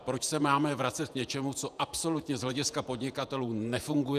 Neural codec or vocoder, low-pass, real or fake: vocoder, 48 kHz, 128 mel bands, Vocos; 14.4 kHz; fake